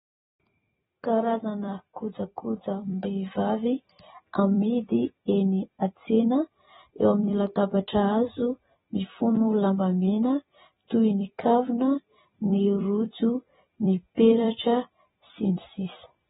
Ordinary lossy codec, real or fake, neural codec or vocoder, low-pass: AAC, 16 kbps; fake; vocoder, 44.1 kHz, 128 mel bands every 256 samples, BigVGAN v2; 19.8 kHz